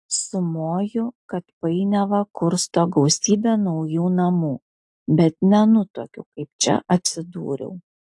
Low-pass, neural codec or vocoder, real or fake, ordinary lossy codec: 10.8 kHz; none; real; AAC, 64 kbps